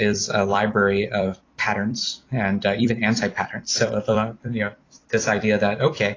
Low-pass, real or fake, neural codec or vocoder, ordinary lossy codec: 7.2 kHz; fake; vocoder, 44.1 kHz, 128 mel bands every 256 samples, BigVGAN v2; AAC, 32 kbps